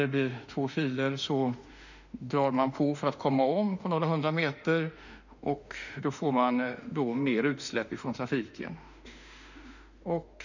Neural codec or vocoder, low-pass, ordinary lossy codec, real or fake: autoencoder, 48 kHz, 32 numbers a frame, DAC-VAE, trained on Japanese speech; 7.2 kHz; none; fake